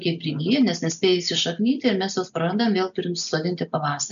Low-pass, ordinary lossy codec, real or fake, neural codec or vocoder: 7.2 kHz; MP3, 96 kbps; real; none